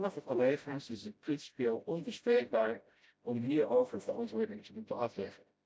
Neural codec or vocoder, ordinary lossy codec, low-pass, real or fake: codec, 16 kHz, 0.5 kbps, FreqCodec, smaller model; none; none; fake